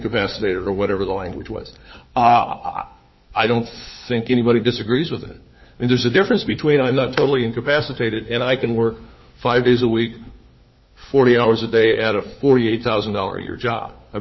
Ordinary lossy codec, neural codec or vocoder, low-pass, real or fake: MP3, 24 kbps; codec, 16 kHz, 4 kbps, FunCodec, trained on LibriTTS, 50 frames a second; 7.2 kHz; fake